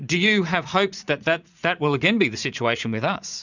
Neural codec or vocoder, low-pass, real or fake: vocoder, 44.1 kHz, 80 mel bands, Vocos; 7.2 kHz; fake